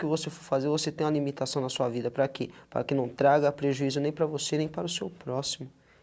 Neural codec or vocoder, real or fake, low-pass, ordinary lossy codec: none; real; none; none